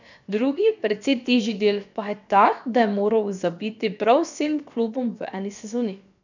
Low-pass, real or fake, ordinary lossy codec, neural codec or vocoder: 7.2 kHz; fake; none; codec, 16 kHz, about 1 kbps, DyCAST, with the encoder's durations